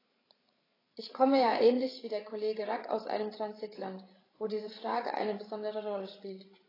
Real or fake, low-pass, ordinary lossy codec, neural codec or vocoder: fake; 5.4 kHz; AAC, 24 kbps; codec, 16 kHz, 8 kbps, FreqCodec, larger model